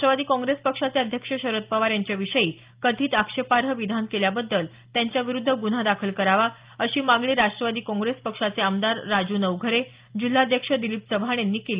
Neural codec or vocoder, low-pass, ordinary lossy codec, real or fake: none; 3.6 kHz; Opus, 32 kbps; real